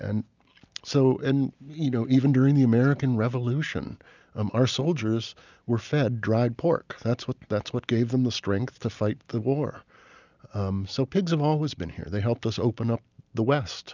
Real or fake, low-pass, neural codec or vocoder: real; 7.2 kHz; none